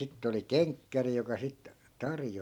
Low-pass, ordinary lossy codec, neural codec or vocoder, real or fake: 19.8 kHz; none; none; real